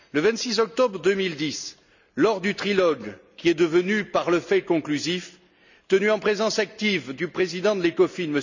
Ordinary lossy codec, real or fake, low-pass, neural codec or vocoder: none; real; 7.2 kHz; none